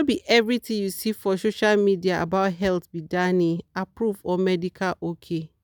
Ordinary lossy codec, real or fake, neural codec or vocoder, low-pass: none; real; none; none